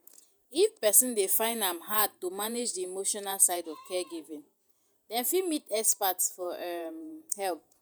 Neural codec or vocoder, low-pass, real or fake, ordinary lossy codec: vocoder, 48 kHz, 128 mel bands, Vocos; none; fake; none